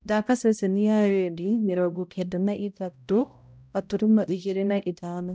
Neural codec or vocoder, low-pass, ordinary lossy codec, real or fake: codec, 16 kHz, 0.5 kbps, X-Codec, HuBERT features, trained on balanced general audio; none; none; fake